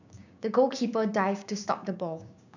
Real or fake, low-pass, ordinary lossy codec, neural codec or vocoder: fake; 7.2 kHz; none; codec, 16 kHz, 6 kbps, DAC